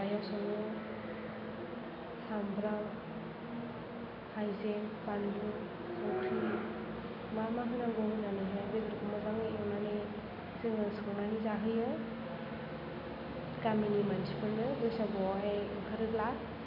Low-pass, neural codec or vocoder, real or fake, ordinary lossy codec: 5.4 kHz; none; real; AAC, 48 kbps